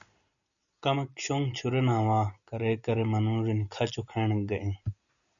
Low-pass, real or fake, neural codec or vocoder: 7.2 kHz; real; none